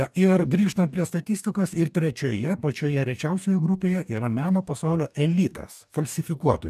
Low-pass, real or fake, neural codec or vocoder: 14.4 kHz; fake; codec, 44.1 kHz, 2.6 kbps, DAC